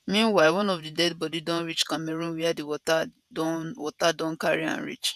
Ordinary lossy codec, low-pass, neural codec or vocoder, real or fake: none; 14.4 kHz; vocoder, 48 kHz, 128 mel bands, Vocos; fake